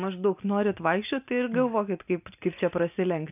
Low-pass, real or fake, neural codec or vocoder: 3.6 kHz; real; none